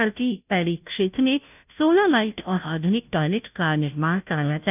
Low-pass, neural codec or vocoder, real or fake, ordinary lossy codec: 3.6 kHz; codec, 16 kHz, 0.5 kbps, FunCodec, trained on Chinese and English, 25 frames a second; fake; none